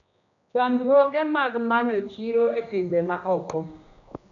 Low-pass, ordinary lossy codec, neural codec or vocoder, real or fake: 7.2 kHz; none; codec, 16 kHz, 1 kbps, X-Codec, HuBERT features, trained on general audio; fake